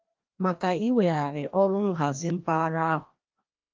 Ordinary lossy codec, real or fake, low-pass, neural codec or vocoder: Opus, 24 kbps; fake; 7.2 kHz; codec, 16 kHz, 1 kbps, FreqCodec, larger model